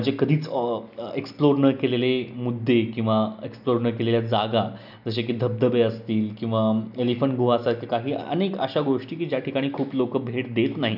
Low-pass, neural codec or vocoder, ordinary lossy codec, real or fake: 5.4 kHz; none; none; real